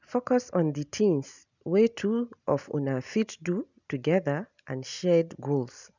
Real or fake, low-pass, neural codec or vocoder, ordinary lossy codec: real; 7.2 kHz; none; none